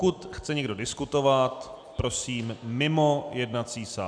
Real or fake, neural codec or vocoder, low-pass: real; none; 9.9 kHz